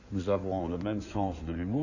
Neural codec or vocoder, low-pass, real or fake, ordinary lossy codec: codec, 16 kHz in and 24 kHz out, 2.2 kbps, FireRedTTS-2 codec; 7.2 kHz; fake; none